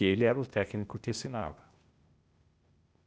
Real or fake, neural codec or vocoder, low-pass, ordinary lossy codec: fake; codec, 16 kHz, 0.8 kbps, ZipCodec; none; none